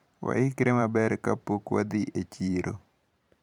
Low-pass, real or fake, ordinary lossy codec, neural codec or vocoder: 19.8 kHz; fake; none; vocoder, 44.1 kHz, 128 mel bands every 512 samples, BigVGAN v2